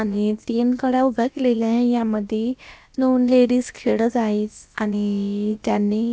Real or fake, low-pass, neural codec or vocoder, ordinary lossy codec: fake; none; codec, 16 kHz, about 1 kbps, DyCAST, with the encoder's durations; none